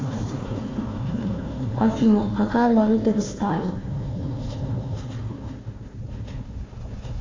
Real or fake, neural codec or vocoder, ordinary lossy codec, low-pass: fake; codec, 16 kHz, 1 kbps, FunCodec, trained on Chinese and English, 50 frames a second; AAC, 32 kbps; 7.2 kHz